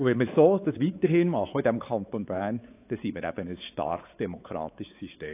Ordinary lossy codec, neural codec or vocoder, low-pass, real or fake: AAC, 24 kbps; codec, 16 kHz, 4 kbps, X-Codec, WavLM features, trained on Multilingual LibriSpeech; 3.6 kHz; fake